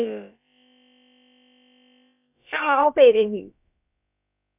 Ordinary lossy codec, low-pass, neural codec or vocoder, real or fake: none; 3.6 kHz; codec, 16 kHz, about 1 kbps, DyCAST, with the encoder's durations; fake